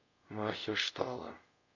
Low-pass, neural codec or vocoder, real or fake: 7.2 kHz; codec, 24 kHz, 0.5 kbps, DualCodec; fake